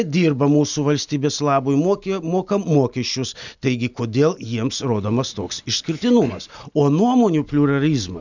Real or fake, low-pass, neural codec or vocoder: real; 7.2 kHz; none